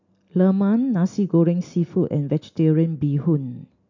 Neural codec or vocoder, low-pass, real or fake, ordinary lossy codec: none; 7.2 kHz; real; MP3, 64 kbps